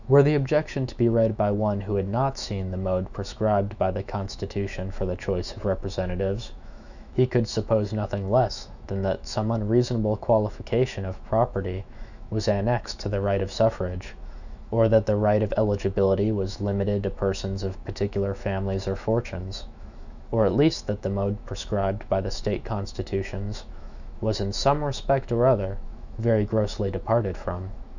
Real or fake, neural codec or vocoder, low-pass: fake; autoencoder, 48 kHz, 128 numbers a frame, DAC-VAE, trained on Japanese speech; 7.2 kHz